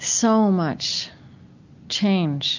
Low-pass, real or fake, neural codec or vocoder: 7.2 kHz; real; none